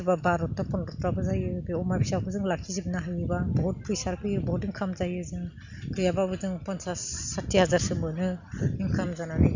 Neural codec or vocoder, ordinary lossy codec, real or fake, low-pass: none; none; real; 7.2 kHz